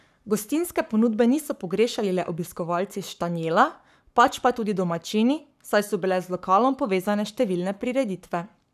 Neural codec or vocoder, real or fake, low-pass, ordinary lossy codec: codec, 44.1 kHz, 7.8 kbps, Pupu-Codec; fake; 14.4 kHz; none